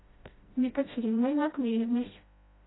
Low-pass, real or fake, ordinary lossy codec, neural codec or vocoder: 7.2 kHz; fake; AAC, 16 kbps; codec, 16 kHz, 0.5 kbps, FreqCodec, smaller model